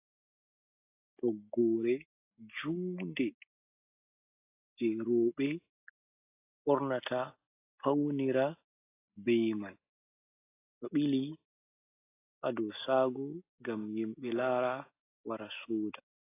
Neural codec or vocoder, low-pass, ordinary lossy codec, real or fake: none; 3.6 kHz; AAC, 24 kbps; real